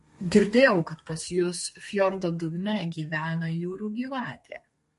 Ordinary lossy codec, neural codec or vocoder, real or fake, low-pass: MP3, 48 kbps; codec, 32 kHz, 1.9 kbps, SNAC; fake; 14.4 kHz